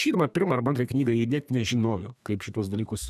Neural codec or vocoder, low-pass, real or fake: codec, 32 kHz, 1.9 kbps, SNAC; 14.4 kHz; fake